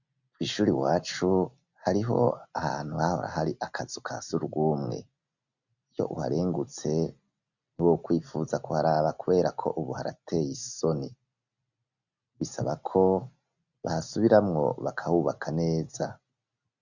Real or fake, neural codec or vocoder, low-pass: real; none; 7.2 kHz